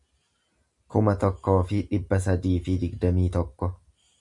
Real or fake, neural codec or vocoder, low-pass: real; none; 10.8 kHz